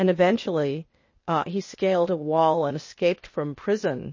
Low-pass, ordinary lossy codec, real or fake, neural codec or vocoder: 7.2 kHz; MP3, 32 kbps; fake; codec, 16 kHz, 0.8 kbps, ZipCodec